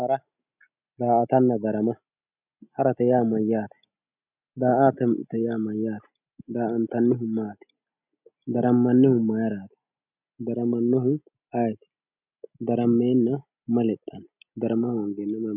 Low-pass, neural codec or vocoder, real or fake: 3.6 kHz; none; real